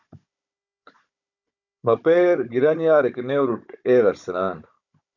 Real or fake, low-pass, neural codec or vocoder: fake; 7.2 kHz; codec, 16 kHz, 16 kbps, FunCodec, trained on Chinese and English, 50 frames a second